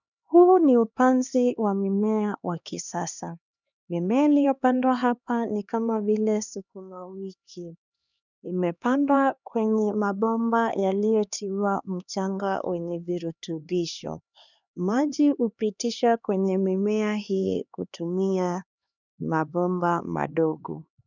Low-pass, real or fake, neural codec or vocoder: 7.2 kHz; fake; codec, 16 kHz, 2 kbps, X-Codec, HuBERT features, trained on LibriSpeech